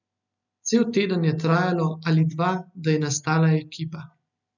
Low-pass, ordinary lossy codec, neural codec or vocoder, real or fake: 7.2 kHz; none; none; real